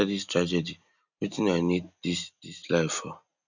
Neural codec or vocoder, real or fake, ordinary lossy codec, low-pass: none; real; none; 7.2 kHz